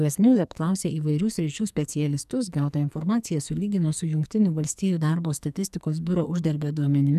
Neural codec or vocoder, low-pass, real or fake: codec, 44.1 kHz, 2.6 kbps, SNAC; 14.4 kHz; fake